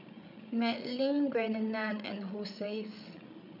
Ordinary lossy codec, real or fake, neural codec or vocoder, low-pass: none; fake; codec, 16 kHz, 8 kbps, FreqCodec, larger model; 5.4 kHz